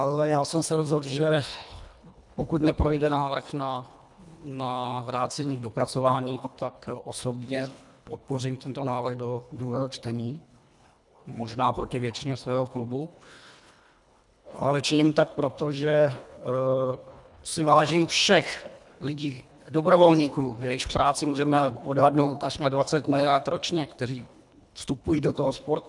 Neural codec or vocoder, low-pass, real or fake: codec, 24 kHz, 1.5 kbps, HILCodec; 10.8 kHz; fake